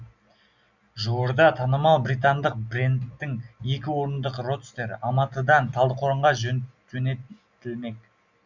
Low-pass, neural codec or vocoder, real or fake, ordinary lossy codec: 7.2 kHz; none; real; none